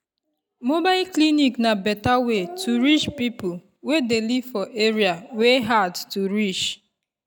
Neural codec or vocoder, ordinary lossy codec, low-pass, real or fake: none; none; 19.8 kHz; real